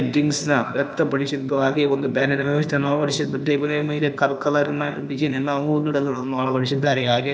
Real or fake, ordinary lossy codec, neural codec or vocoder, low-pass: fake; none; codec, 16 kHz, 0.8 kbps, ZipCodec; none